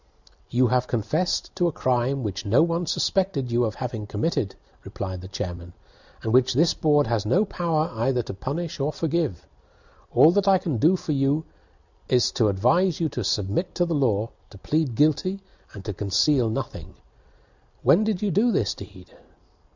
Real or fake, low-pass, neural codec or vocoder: real; 7.2 kHz; none